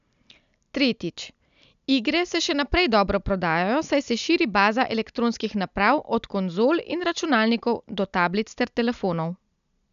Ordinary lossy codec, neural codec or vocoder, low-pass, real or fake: none; none; 7.2 kHz; real